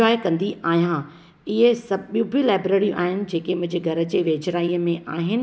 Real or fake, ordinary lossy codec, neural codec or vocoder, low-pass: real; none; none; none